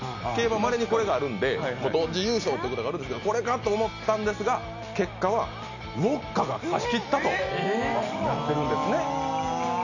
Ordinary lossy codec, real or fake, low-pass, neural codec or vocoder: none; real; 7.2 kHz; none